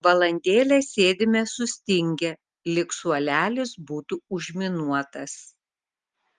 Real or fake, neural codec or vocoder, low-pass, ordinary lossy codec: real; none; 10.8 kHz; Opus, 24 kbps